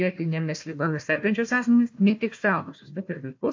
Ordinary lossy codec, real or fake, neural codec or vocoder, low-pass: MP3, 48 kbps; fake; codec, 16 kHz, 1 kbps, FunCodec, trained on Chinese and English, 50 frames a second; 7.2 kHz